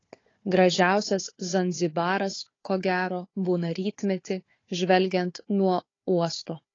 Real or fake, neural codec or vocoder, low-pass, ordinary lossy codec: fake; codec, 16 kHz, 4 kbps, FunCodec, trained on Chinese and English, 50 frames a second; 7.2 kHz; AAC, 32 kbps